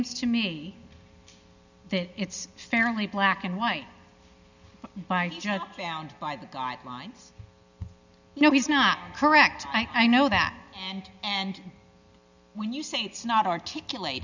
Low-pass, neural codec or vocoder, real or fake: 7.2 kHz; none; real